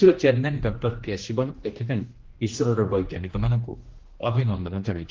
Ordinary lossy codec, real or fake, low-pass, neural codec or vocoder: Opus, 16 kbps; fake; 7.2 kHz; codec, 16 kHz, 1 kbps, X-Codec, HuBERT features, trained on general audio